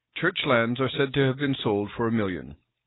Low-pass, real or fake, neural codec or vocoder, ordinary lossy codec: 7.2 kHz; real; none; AAC, 16 kbps